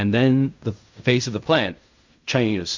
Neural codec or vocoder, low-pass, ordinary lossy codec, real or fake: codec, 16 kHz in and 24 kHz out, 0.4 kbps, LongCat-Audio-Codec, fine tuned four codebook decoder; 7.2 kHz; MP3, 64 kbps; fake